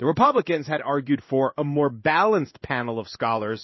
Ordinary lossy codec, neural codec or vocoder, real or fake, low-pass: MP3, 24 kbps; codec, 16 kHz in and 24 kHz out, 1 kbps, XY-Tokenizer; fake; 7.2 kHz